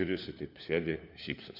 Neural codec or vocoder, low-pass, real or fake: codec, 16 kHz, 4 kbps, FunCodec, trained on LibriTTS, 50 frames a second; 5.4 kHz; fake